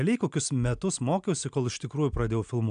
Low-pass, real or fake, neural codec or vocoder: 9.9 kHz; real; none